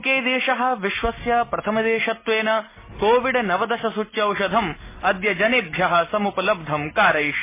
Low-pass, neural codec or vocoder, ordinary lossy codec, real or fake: 3.6 kHz; none; MP3, 16 kbps; real